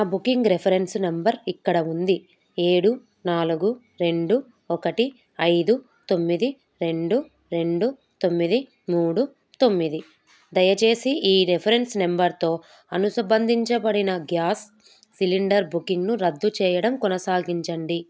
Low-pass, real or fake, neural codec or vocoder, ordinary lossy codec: none; real; none; none